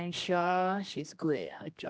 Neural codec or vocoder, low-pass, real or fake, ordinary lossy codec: codec, 16 kHz, 1 kbps, X-Codec, HuBERT features, trained on general audio; none; fake; none